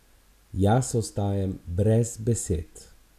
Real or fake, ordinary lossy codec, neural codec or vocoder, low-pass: real; none; none; 14.4 kHz